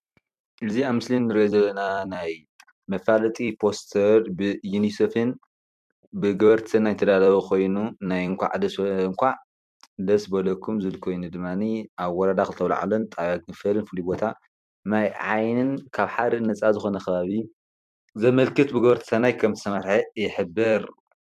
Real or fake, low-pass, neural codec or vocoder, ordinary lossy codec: fake; 14.4 kHz; vocoder, 44.1 kHz, 128 mel bands every 256 samples, BigVGAN v2; MP3, 96 kbps